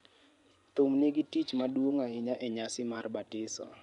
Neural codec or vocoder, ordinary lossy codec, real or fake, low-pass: vocoder, 24 kHz, 100 mel bands, Vocos; none; fake; 10.8 kHz